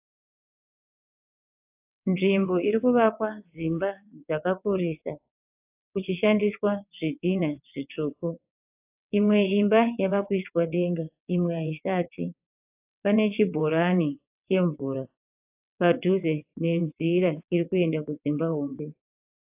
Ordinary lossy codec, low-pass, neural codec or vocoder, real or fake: AAC, 32 kbps; 3.6 kHz; vocoder, 22.05 kHz, 80 mel bands, WaveNeXt; fake